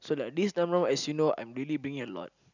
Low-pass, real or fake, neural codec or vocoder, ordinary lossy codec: 7.2 kHz; real; none; none